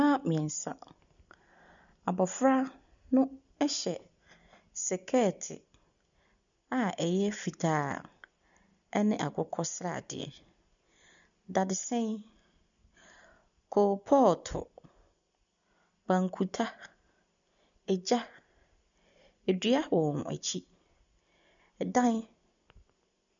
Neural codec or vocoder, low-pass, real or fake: none; 7.2 kHz; real